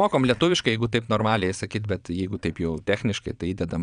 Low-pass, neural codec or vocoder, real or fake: 9.9 kHz; vocoder, 22.05 kHz, 80 mel bands, Vocos; fake